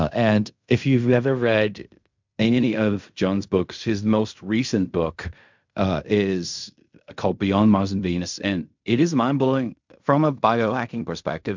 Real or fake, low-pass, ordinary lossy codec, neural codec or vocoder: fake; 7.2 kHz; MP3, 64 kbps; codec, 16 kHz in and 24 kHz out, 0.4 kbps, LongCat-Audio-Codec, fine tuned four codebook decoder